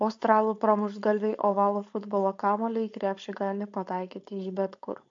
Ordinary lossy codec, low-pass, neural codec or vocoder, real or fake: MP3, 48 kbps; 7.2 kHz; codec, 16 kHz, 4.8 kbps, FACodec; fake